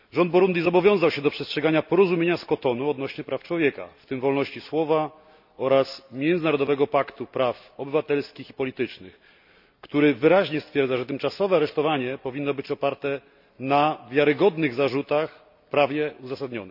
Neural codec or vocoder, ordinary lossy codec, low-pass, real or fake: none; none; 5.4 kHz; real